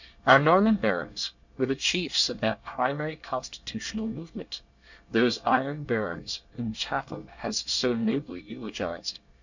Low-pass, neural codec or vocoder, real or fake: 7.2 kHz; codec, 24 kHz, 1 kbps, SNAC; fake